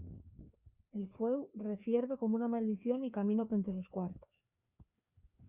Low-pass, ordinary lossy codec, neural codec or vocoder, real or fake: 3.6 kHz; Opus, 64 kbps; codec, 16 kHz, 2 kbps, FreqCodec, larger model; fake